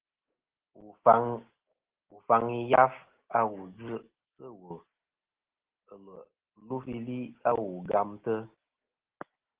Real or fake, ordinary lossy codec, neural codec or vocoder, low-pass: real; Opus, 16 kbps; none; 3.6 kHz